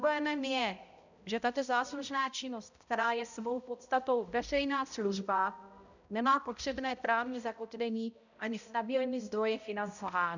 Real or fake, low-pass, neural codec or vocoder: fake; 7.2 kHz; codec, 16 kHz, 0.5 kbps, X-Codec, HuBERT features, trained on balanced general audio